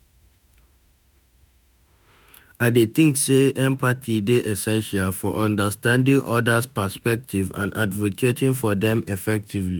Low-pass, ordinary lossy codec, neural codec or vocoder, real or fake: none; none; autoencoder, 48 kHz, 32 numbers a frame, DAC-VAE, trained on Japanese speech; fake